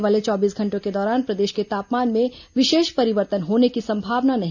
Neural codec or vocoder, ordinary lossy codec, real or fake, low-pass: none; none; real; 7.2 kHz